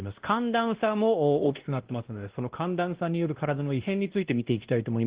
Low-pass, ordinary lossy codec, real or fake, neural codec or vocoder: 3.6 kHz; Opus, 16 kbps; fake; codec, 16 kHz, 1 kbps, X-Codec, WavLM features, trained on Multilingual LibriSpeech